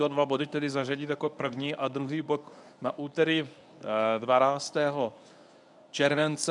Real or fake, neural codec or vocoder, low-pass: fake; codec, 24 kHz, 0.9 kbps, WavTokenizer, medium speech release version 1; 10.8 kHz